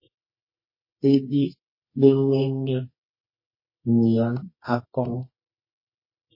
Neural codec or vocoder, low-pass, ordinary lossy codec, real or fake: codec, 24 kHz, 0.9 kbps, WavTokenizer, medium music audio release; 5.4 kHz; MP3, 24 kbps; fake